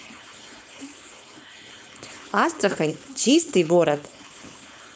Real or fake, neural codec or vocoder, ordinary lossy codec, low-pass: fake; codec, 16 kHz, 4.8 kbps, FACodec; none; none